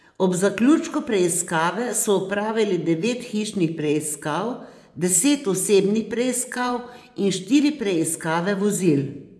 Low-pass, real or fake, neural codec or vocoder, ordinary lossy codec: none; fake; vocoder, 24 kHz, 100 mel bands, Vocos; none